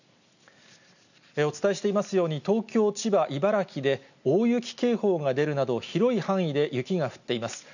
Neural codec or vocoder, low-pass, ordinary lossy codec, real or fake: none; 7.2 kHz; none; real